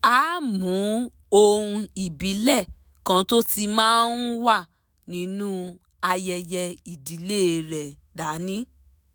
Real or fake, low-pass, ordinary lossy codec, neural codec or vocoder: fake; none; none; autoencoder, 48 kHz, 128 numbers a frame, DAC-VAE, trained on Japanese speech